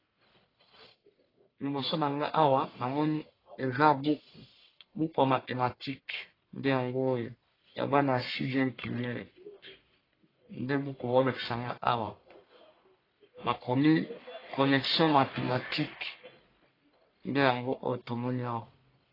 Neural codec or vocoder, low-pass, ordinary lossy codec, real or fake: codec, 44.1 kHz, 1.7 kbps, Pupu-Codec; 5.4 kHz; AAC, 24 kbps; fake